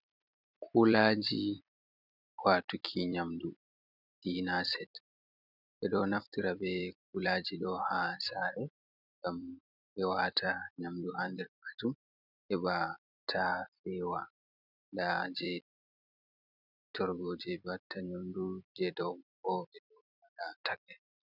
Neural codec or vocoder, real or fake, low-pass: vocoder, 44.1 kHz, 128 mel bands every 256 samples, BigVGAN v2; fake; 5.4 kHz